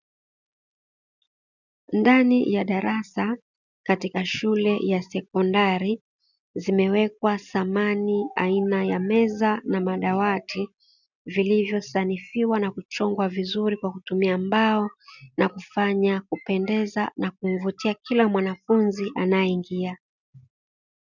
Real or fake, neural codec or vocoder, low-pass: real; none; 7.2 kHz